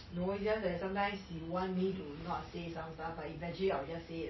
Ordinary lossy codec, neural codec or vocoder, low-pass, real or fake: MP3, 24 kbps; none; 7.2 kHz; real